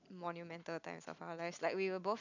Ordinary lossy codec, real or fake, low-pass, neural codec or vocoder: none; real; 7.2 kHz; none